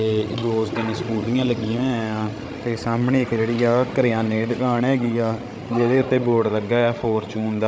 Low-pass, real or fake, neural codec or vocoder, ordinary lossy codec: none; fake; codec, 16 kHz, 16 kbps, FreqCodec, larger model; none